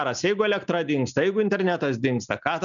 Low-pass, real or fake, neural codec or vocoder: 7.2 kHz; real; none